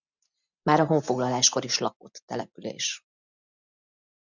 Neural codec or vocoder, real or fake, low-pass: none; real; 7.2 kHz